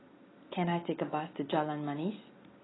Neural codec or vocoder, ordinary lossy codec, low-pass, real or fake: none; AAC, 16 kbps; 7.2 kHz; real